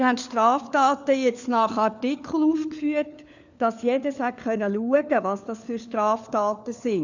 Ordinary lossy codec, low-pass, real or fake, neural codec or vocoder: none; 7.2 kHz; fake; codec, 16 kHz, 4 kbps, FunCodec, trained on LibriTTS, 50 frames a second